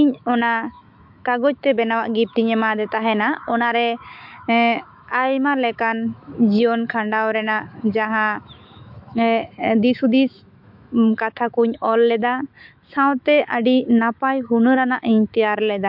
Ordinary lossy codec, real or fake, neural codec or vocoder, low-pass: MP3, 48 kbps; fake; autoencoder, 48 kHz, 128 numbers a frame, DAC-VAE, trained on Japanese speech; 5.4 kHz